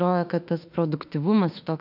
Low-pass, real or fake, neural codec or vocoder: 5.4 kHz; fake; autoencoder, 48 kHz, 32 numbers a frame, DAC-VAE, trained on Japanese speech